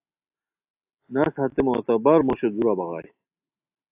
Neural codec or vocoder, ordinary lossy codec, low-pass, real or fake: none; AAC, 32 kbps; 3.6 kHz; real